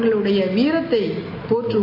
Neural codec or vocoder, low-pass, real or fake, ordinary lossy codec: none; 5.4 kHz; real; AAC, 32 kbps